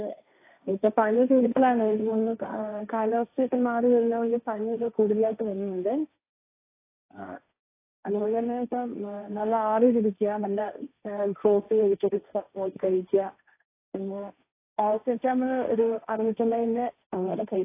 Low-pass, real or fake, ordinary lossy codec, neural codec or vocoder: 3.6 kHz; fake; AAC, 24 kbps; codec, 16 kHz, 1.1 kbps, Voila-Tokenizer